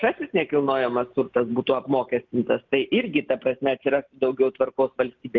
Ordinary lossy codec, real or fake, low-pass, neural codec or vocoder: Opus, 24 kbps; real; 7.2 kHz; none